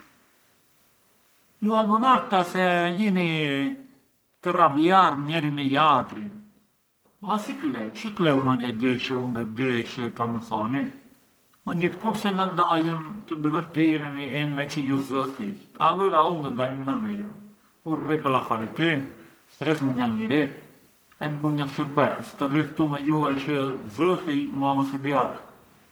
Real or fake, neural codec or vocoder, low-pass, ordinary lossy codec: fake; codec, 44.1 kHz, 1.7 kbps, Pupu-Codec; none; none